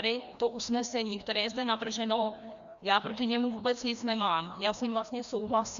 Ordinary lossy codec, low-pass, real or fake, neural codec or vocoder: Opus, 64 kbps; 7.2 kHz; fake; codec, 16 kHz, 1 kbps, FreqCodec, larger model